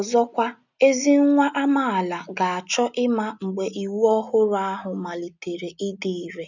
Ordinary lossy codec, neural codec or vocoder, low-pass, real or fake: none; none; 7.2 kHz; real